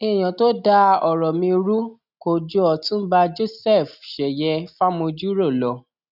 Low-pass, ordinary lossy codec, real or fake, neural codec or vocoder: 5.4 kHz; none; real; none